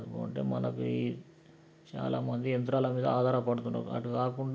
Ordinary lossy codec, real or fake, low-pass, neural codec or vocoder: none; real; none; none